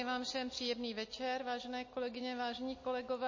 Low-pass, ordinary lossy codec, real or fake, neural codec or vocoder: 7.2 kHz; MP3, 32 kbps; real; none